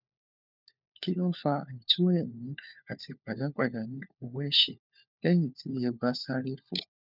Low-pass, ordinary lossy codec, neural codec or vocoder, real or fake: 5.4 kHz; none; codec, 16 kHz, 4 kbps, FunCodec, trained on LibriTTS, 50 frames a second; fake